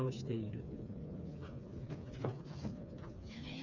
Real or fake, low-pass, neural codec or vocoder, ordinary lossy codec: fake; 7.2 kHz; codec, 16 kHz, 4 kbps, FreqCodec, smaller model; none